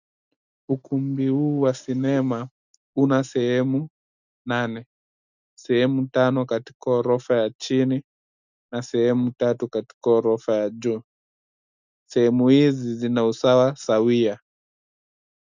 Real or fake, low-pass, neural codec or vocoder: real; 7.2 kHz; none